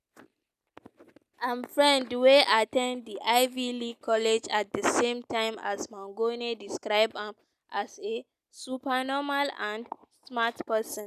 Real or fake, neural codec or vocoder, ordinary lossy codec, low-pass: real; none; none; 14.4 kHz